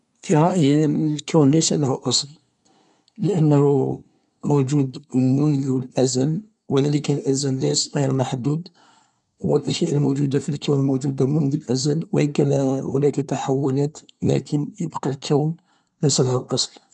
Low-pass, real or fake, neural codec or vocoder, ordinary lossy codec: 10.8 kHz; fake; codec, 24 kHz, 1 kbps, SNAC; none